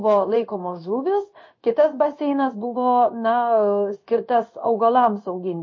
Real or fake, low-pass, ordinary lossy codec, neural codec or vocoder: fake; 7.2 kHz; MP3, 32 kbps; codec, 16 kHz in and 24 kHz out, 1 kbps, XY-Tokenizer